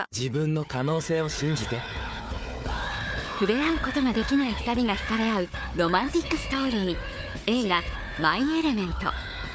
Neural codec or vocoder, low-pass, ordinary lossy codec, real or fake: codec, 16 kHz, 4 kbps, FunCodec, trained on Chinese and English, 50 frames a second; none; none; fake